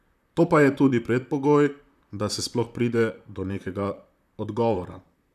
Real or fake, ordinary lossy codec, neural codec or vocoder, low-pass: fake; none; vocoder, 44.1 kHz, 128 mel bands, Pupu-Vocoder; 14.4 kHz